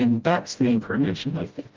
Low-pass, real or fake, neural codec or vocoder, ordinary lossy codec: 7.2 kHz; fake; codec, 16 kHz, 0.5 kbps, FreqCodec, smaller model; Opus, 24 kbps